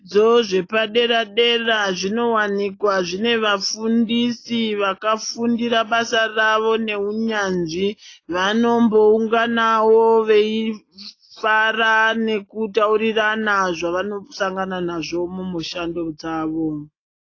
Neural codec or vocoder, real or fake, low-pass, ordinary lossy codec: none; real; 7.2 kHz; AAC, 32 kbps